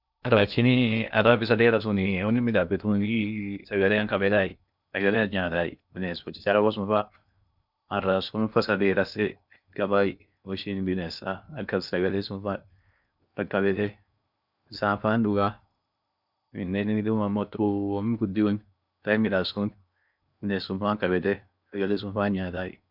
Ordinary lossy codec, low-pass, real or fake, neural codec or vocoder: none; 5.4 kHz; fake; codec, 16 kHz in and 24 kHz out, 0.8 kbps, FocalCodec, streaming, 65536 codes